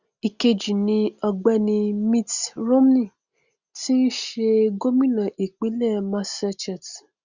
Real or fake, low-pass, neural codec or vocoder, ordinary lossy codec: real; 7.2 kHz; none; Opus, 64 kbps